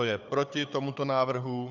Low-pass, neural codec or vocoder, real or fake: 7.2 kHz; codec, 16 kHz, 4 kbps, FunCodec, trained on Chinese and English, 50 frames a second; fake